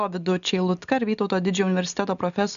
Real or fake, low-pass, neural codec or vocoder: real; 7.2 kHz; none